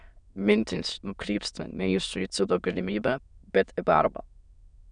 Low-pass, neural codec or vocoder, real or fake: 9.9 kHz; autoencoder, 22.05 kHz, a latent of 192 numbers a frame, VITS, trained on many speakers; fake